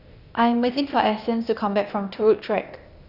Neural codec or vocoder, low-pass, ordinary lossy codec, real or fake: codec, 16 kHz, 0.8 kbps, ZipCodec; 5.4 kHz; none; fake